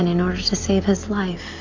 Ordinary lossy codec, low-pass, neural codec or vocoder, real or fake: AAC, 48 kbps; 7.2 kHz; none; real